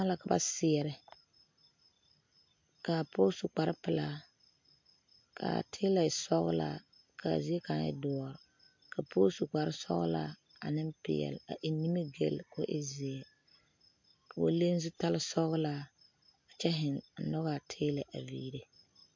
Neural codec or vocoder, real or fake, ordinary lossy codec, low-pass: none; real; MP3, 48 kbps; 7.2 kHz